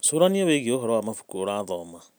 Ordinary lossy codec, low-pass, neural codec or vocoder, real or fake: none; none; none; real